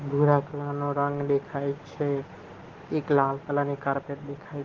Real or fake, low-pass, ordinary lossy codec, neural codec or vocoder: real; 7.2 kHz; Opus, 16 kbps; none